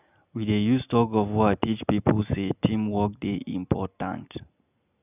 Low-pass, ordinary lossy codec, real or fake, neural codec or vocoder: 3.6 kHz; none; real; none